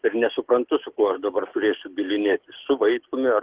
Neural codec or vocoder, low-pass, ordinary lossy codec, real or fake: codec, 44.1 kHz, 7.8 kbps, DAC; 3.6 kHz; Opus, 16 kbps; fake